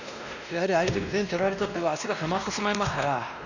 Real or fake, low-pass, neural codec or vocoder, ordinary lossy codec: fake; 7.2 kHz; codec, 16 kHz, 1 kbps, X-Codec, WavLM features, trained on Multilingual LibriSpeech; none